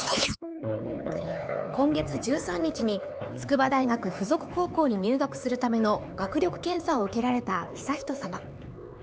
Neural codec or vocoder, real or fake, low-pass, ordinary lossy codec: codec, 16 kHz, 4 kbps, X-Codec, HuBERT features, trained on LibriSpeech; fake; none; none